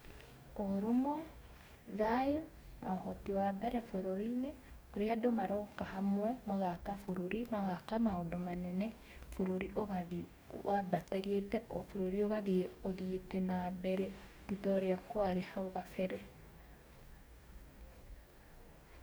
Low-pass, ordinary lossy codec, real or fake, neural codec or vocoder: none; none; fake; codec, 44.1 kHz, 2.6 kbps, DAC